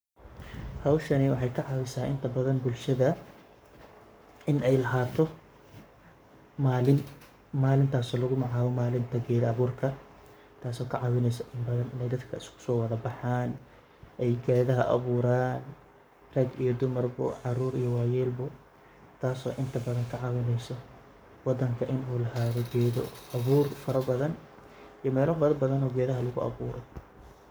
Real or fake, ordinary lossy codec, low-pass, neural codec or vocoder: fake; none; none; codec, 44.1 kHz, 7.8 kbps, Pupu-Codec